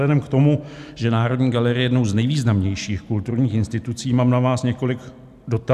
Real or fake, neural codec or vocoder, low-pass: real; none; 14.4 kHz